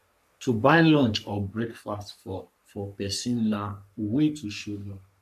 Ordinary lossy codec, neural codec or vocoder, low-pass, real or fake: none; codec, 44.1 kHz, 3.4 kbps, Pupu-Codec; 14.4 kHz; fake